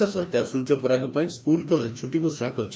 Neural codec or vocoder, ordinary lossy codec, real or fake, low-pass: codec, 16 kHz, 1 kbps, FreqCodec, larger model; none; fake; none